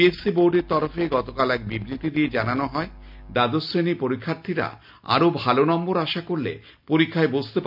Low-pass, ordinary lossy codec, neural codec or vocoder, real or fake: 5.4 kHz; MP3, 48 kbps; none; real